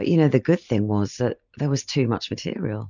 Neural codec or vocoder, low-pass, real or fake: none; 7.2 kHz; real